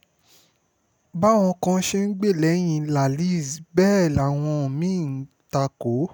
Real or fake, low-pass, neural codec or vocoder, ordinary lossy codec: real; none; none; none